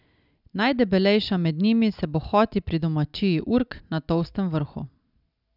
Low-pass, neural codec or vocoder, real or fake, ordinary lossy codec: 5.4 kHz; none; real; none